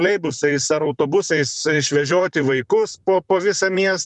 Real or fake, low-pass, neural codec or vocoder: fake; 10.8 kHz; vocoder, 44.1 kHz, 128 mel bands, Pupu-Vocoder